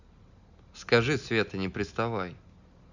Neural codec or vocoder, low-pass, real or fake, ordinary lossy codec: none; 7.2 kHz; real; none